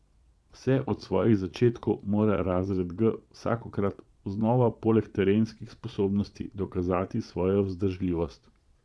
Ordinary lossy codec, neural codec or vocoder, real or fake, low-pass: none; vocoder, 22.05 kHz, 80 mel bands, Vocos; fake; none